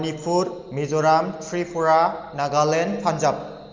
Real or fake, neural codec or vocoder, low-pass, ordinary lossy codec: real; none; 7.2 kHz; Opus, 32 kbps